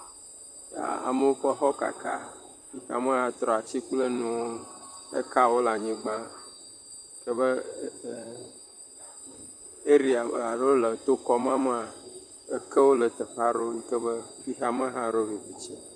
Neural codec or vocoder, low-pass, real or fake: vocoder, 44.1 kHz, 128 mel bands, Pupu-Vocoder; 9.9 kHz; fake